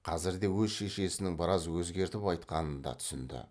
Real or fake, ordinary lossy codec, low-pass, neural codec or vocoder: real; none; none; none